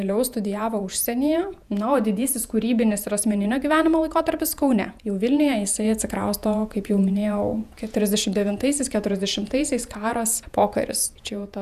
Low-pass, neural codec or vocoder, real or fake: 14.4 kHz; vocoder, 48 kHz, 128 mel bands, Vocos; fake